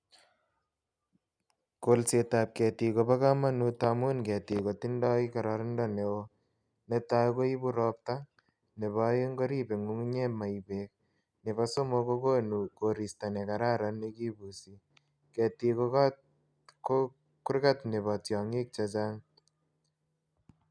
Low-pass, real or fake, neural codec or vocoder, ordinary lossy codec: 9.9 kHz; real; none; none